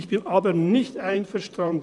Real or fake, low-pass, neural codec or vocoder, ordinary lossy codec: fake; 10.8 kHz; vocoder, 44.1 kHz, 128 mel bands, Pupu-Vocoder; none